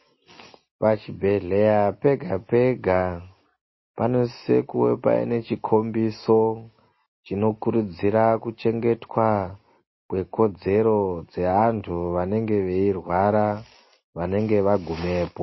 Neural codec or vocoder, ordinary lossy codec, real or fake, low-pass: none; MP3, 24 kbps; real; 7.2 kHz